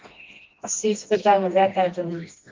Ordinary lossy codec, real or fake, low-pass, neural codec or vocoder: Opus, 32 kbps; fake; 7.2 kHz; codec, 16 kHz, 1 kbps, FreqCodec, smaller model